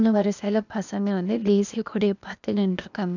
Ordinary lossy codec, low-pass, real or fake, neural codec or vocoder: none; 7.2 kHz; fake; codec, 16 kHz, 0.8 kbps, ZipCodec